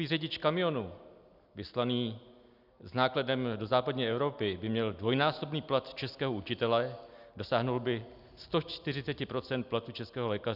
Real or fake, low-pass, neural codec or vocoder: real; 5.4 kHz; none